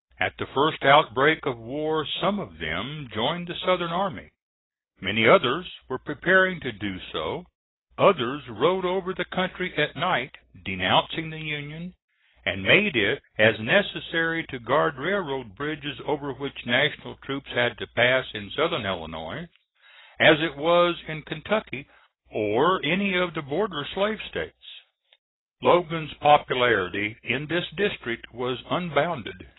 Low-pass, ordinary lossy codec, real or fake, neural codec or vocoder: 7.2 kHz; AAC, 16 kbps; real; none